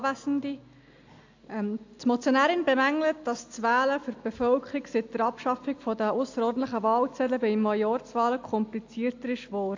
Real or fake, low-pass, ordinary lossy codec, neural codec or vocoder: real; 7.2 kHz; AAC, 48 kbps; none